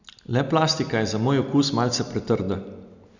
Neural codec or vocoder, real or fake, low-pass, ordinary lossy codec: none; real; 7.2 kHz; none